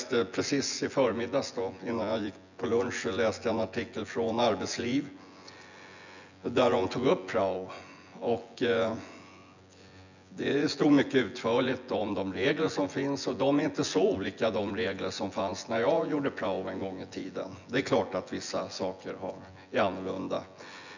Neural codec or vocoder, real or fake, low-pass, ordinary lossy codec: vocoder, 24 kHz, 100 mel bands, Vocos; fake; 7.2 kHz; none